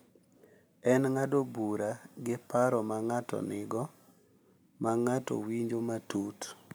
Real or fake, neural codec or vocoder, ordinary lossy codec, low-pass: real; none; none; none